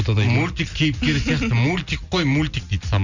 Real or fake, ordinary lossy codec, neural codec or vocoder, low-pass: real; none; none; 7.2 kHz